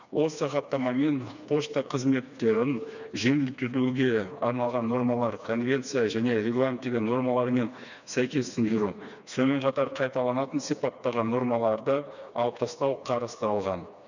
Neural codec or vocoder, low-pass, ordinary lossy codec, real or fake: codec, 16 kHz, 2 kbps, FreqCodec, smaller model; 7.2 kHz; none; fake